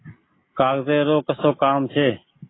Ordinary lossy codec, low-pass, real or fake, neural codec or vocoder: AAC, 16 kbps; 7.2 kHz; real; none